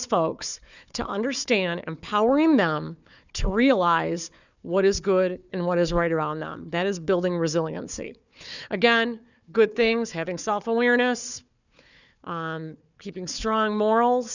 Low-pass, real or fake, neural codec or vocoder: 7.2 kHz; fake; codec, 16 kHz, 4 kbps, FunCodec, trained on Chinese and English, 50 frames a second